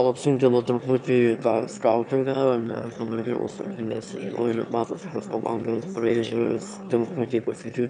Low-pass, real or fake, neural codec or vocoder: 9.9 kHz; fake; autoencoder, 22.05 kHz, a latent of 192 numbers a frame, VITS, trained on one speaker